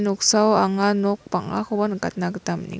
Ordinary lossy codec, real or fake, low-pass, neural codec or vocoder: none; real; none; none